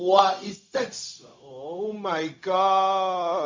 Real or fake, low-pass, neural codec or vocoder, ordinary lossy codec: fake; 7.2 kHz; codec, 16 kHz, 0.4 kbps, LongCat-Audio-Codec; MP3, 48 kbps